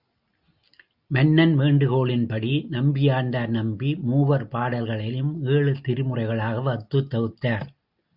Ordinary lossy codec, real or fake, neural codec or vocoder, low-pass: Opus, 64 kbps; real; none; 5.4 kHz